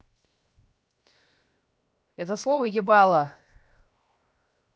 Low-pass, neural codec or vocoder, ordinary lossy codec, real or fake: none; codec, 16 kHz, 0.7 kbps, FocalCodec; none; fake